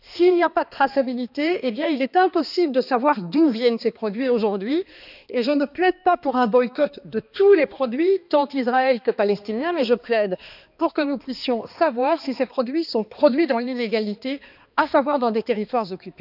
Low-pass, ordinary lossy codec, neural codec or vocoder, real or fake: 5.4 kHz; none; codec, 16 kHz, 2 kbps, X-Codec, HuBERT features, trained on balanced general audio; fake